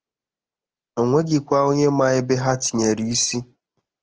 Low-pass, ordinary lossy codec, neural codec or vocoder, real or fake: 7.2 kHz; Opus, 16 kbps; none; real